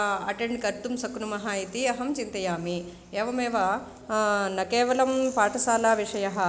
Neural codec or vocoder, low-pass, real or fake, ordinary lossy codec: none; none; real; none